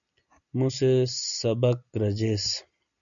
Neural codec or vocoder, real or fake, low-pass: none; real; 7.2 kHz